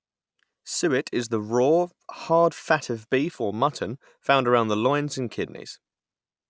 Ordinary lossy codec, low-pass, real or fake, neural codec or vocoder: none; none; real; none